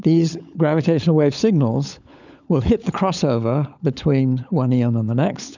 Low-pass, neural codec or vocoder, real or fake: 7.2 kHz; codec, 16 kHz, 16 kbps, FunCodec, trained on LibriTTS, 50 frames a second; fake